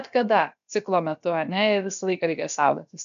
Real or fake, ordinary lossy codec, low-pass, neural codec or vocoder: fake; MP3, 64 kbps; 7.2 kHz; codec, 16 kHz, about 1 kbps, DyCAST, with the encoder's durations